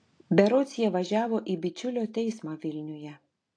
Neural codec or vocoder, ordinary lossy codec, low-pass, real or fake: none; AAC, 48 kbps; 9.9 kHz; real